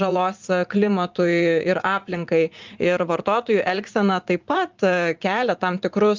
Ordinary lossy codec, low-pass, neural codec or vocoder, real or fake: Opus, 32 kbps; 7.2 kHz; vocoder, 44.1 kHz, 80 mel bands, Vocos; fake